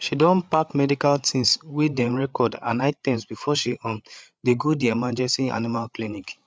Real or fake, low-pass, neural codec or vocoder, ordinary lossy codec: fake; none; codec, 16 kHz, 8 kbps, FreqCodec, larger model; none